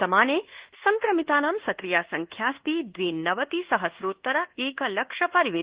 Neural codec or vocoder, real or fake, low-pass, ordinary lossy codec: codec, 24 kHz, 1.2 kbps, DualCodec; fake; 3.6 kHz; Opus, 16 kbps